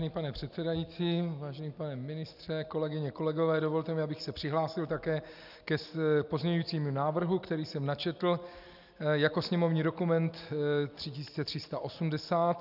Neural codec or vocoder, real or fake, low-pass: none; real; 5.4 kHz